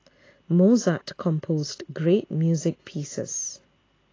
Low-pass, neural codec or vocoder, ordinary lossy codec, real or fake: 7.2 kHz; none; AAC, 32 kbps; real